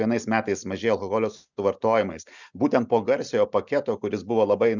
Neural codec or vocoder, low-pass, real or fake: none; 7.2 kHz; real